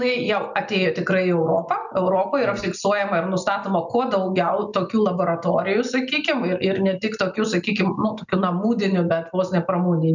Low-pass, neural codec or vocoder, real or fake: 7.2 kHz; none; real